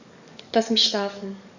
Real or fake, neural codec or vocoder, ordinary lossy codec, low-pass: fake; codec, 44.1 kHz, 7.8 kbps, DAC; none; 7.2 kHz